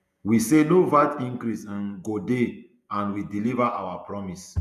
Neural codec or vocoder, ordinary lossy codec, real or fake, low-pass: vocoder, 48 kHz, 128 mel bands, Vocos; none; fake; 14.4 kHz